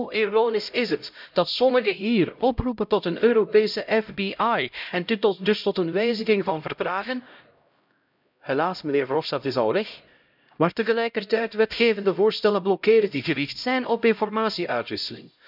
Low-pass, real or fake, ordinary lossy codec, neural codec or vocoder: 5.4 kHz; fake; none; codec, 16 kHz, 0.5 kbps, X-Codec, HuBERT features, trained on LibriSpeech